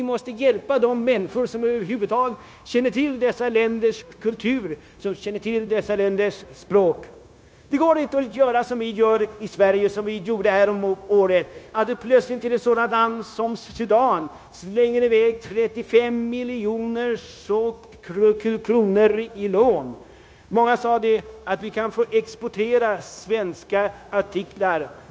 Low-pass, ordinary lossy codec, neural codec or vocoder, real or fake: none; none; codec, 16 kHz, 0.9 kbps, LongCat-Audio-Codec; fake